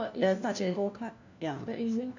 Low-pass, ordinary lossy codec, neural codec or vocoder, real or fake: 7.2 kHz; none; codec, 16 kHz, 1 kbps, FunCodec, trained on LibriTTS, 50 frames a second; fake